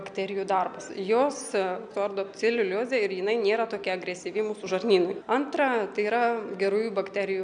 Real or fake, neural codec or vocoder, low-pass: real; none; 9.9 kHz